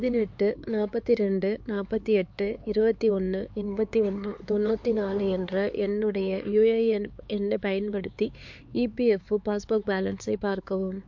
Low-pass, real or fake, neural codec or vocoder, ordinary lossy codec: 7.2 kHz; fake; codec, 16 kHz, 4 kbps, X-Codec, HuBERT features, trained on LibriSpeech; MP3, 48 kbps